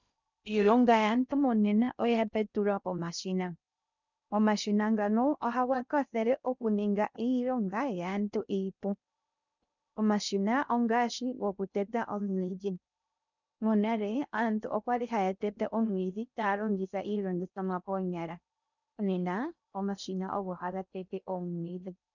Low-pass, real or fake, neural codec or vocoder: 7.2 kHz; fake; codec, 16 kHz in and 24 kHz out, 0.6 kbps, FocalCodec, streaming, 2048 codes